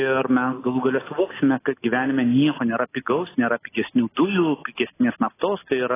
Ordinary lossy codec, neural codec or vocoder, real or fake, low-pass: AAC, 16 kbps; none; real; 3.6 kHz